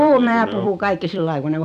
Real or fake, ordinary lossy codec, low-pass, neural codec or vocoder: fake; none; 14.4 kHz; vocoder, 44.1 kHz, 128 mel bands every 256 samples, BigVGAN v2